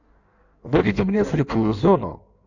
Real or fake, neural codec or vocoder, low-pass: fake; codec, 16 kHz in and 24 kHz out, 1.1 kbps, FireRedTTS-2 codec; 7.2 kHz